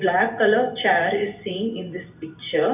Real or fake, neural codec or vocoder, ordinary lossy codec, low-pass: real; none; none; 3.6 kHz